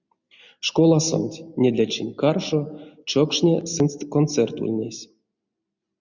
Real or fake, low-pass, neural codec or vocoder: real; 7.2 kHz; none